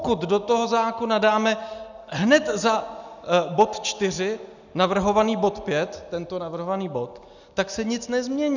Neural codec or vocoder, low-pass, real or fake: none; 7.2 kHz; real